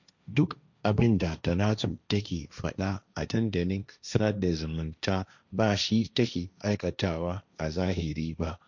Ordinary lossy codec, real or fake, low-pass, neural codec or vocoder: none; fake; 7.2 kHz; codec, 16 kHz, 1.1 kbps, Voila-Tokenizer